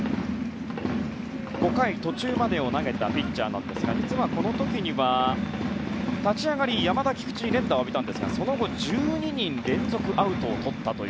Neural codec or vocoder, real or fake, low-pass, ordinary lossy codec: none; real; none; none